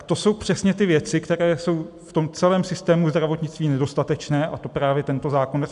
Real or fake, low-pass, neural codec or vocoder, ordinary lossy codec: real; 10.8 kHz; none; AAC, 96 kbps